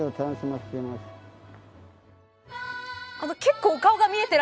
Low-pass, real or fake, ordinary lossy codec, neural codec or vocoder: none; real; none; none